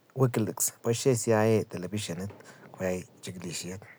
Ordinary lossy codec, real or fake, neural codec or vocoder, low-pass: none; real; none; none